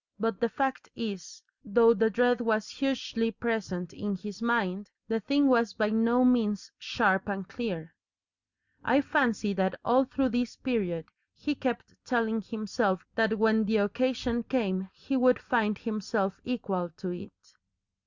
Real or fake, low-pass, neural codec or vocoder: real; 7.2 kHz; none